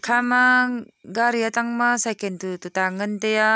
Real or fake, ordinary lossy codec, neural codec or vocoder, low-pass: real; none; none; none